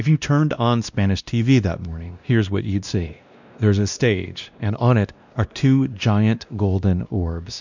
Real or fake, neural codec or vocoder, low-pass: fake; codec, 16 kHz, 1 kbps, X-Codec, WavLM features, trained on Multilingual LibriSpeech; 7.2 kHz